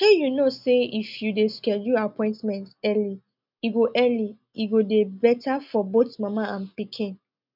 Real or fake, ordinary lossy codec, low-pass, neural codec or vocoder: real; none; 5.4 kHz; none